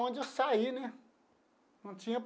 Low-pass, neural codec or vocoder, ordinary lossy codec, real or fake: none; none; none; real